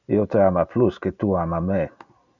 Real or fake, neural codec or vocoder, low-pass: real; none; 7.2 kHz